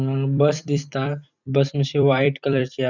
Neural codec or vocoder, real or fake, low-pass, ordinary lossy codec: vocoder, 44.1 kHz, 128 mel bands every 256 samples, BigVGAN v2; fake; 7.2 kHz; none